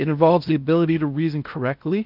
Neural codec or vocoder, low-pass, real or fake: codec, 16 kHz in and 24 kHz out, 0.6 kbps, FocalCodec, streaming, 4096 codes; 5.4 kHz; fake